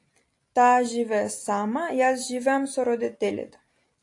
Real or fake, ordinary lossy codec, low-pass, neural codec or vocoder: real; AAC, 48 kbps; 10.8 kHz; none